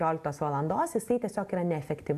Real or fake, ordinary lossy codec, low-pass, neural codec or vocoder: real; AAC, 96 kbps; 14.4 kHz; none